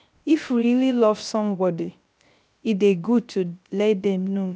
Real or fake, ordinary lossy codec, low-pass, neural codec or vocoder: fake; none; none; codec, 16 kHz, 0.3 kbps, FocalCodec